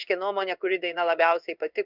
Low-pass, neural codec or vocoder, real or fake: 5.4 kHz; codec, 16 kHz in and 24 kHz out, 1 kbps, XY-Tokenizer; fake